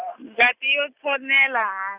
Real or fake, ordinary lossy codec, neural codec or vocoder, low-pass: real; Opus, 64 kbps; none; 3.6 kHz